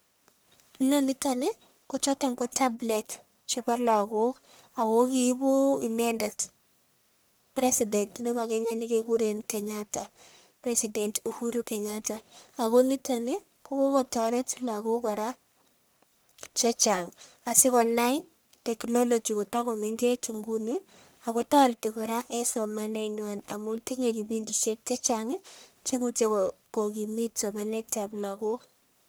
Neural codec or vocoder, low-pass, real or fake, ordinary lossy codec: codec, 44.1 kHz, 1.7 kbps, Pupu-Codec; none; fake; none